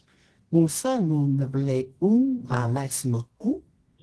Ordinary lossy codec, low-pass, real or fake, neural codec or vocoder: Opus, 16 kbps; 10.8 kHz; fake; codec, 24 kHz, 0.9 kbps, WavTokenizer, medium music audio release